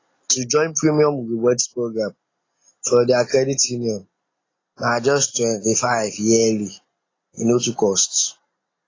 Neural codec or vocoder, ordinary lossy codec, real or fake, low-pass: none; AAC, 32 kbps; real; 7.2 kHz